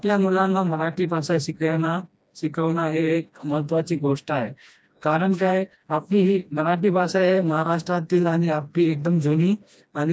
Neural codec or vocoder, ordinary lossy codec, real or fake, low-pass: codec, 16 kHz, 1 kbps, FreqCodec, smaller model; none; fake; none